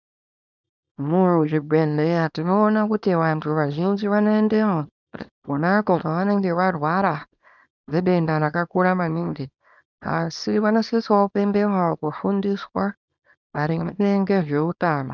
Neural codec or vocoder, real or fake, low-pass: codec, 24 kHz, 0.9 kbps, WavTokenizer, small release; fake; 7.2 kHz